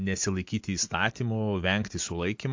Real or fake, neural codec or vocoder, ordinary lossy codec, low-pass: real; none; MP3, 48 kbps; 7.2 kHz